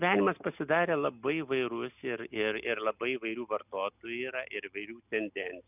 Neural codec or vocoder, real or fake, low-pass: none; real; 3.6 kHz